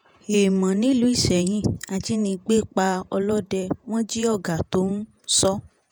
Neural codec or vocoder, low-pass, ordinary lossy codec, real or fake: vocoder, 48 kHz, 128 mel bands, Vocos; none; none; fake